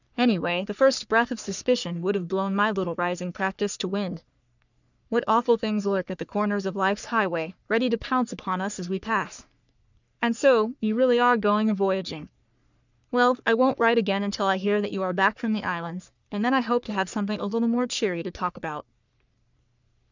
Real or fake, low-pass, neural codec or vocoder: fake; 7.2 kHz; codec, 44.1 kHz, 3.4 kbps, Pupu-Codec